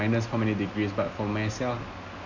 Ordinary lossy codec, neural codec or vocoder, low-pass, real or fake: Opus, 64 kbps; none; 7.2 kHz; real